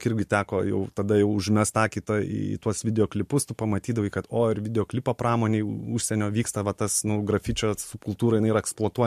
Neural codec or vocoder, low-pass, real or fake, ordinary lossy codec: none; 14.4 kHz; real; MP3, 64 kbps